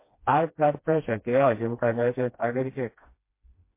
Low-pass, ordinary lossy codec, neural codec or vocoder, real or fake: 3.6 kHz; MP3, 24 kbps; codec, 16 kHz, 1 kbps, FreqCodec, smaller model; fake